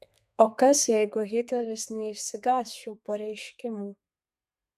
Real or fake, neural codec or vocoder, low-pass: fake; codec, 32 kHz, 1.9 kbps, SNAC; 14.4 kHz